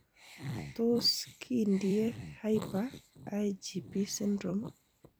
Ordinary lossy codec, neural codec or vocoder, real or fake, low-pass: none; vocoder, 44.1 kHz, 128 mel bands every 256 samples, BigVGAN v2; fake; none